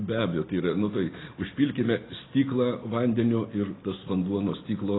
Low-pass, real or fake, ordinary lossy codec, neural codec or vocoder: 7.2 kHz; real; AAC, 16 kbps; none